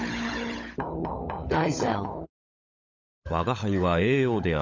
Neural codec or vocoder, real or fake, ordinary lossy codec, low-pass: codec, 16 kHz, 16 kbps, FunCodec, trained on LibriTTS, 50 frames a second; fake; Opus, 64 kbps; 7.2 kHz